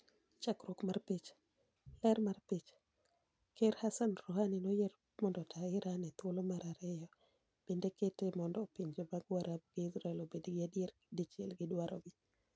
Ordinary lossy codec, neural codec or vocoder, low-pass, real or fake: none; none; none; real